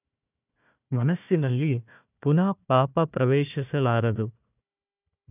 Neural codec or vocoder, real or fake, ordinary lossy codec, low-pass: codec, 16 kHz, 1 kbps, FunCodec, trained on Chinese and English, 50 frames a second; fake; none; 3.6 kHz